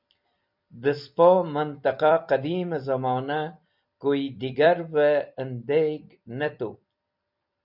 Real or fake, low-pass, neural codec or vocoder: fake; 5.4 kHz; vocoder, 44.1 kHz, 128 mel bands every 256 samples, BigVGAN v2